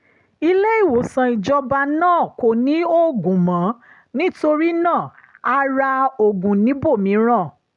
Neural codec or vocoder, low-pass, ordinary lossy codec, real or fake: none; 10.8 kHz; none; real